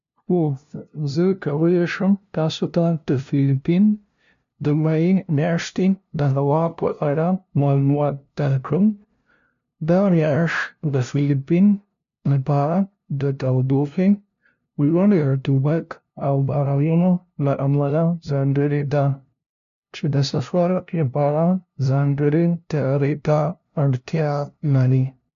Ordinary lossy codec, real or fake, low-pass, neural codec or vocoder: MP3, 64 kbps; fake; 7.2 kHz; codec, 16 kHz, 0.5 kbps, FunCodec, trained on LibriTTS, 25 frames a second